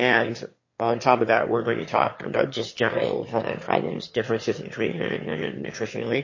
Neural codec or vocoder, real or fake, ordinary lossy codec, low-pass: autoencoder, 22.05 kHz, a latent of 192 numbers a frame, VITS, trained on one speaker; fake; MP3, 32 kbps; 7.2 kHz